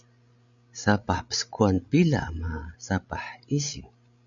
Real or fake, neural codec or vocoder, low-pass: fake; codec, 16 kHz, 16 kbps, FreqCodec, larger model; 7.2 kHz